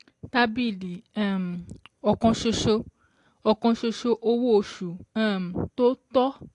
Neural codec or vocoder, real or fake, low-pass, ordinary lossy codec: none; real; 10.8 kHz; AAC, 48 kbps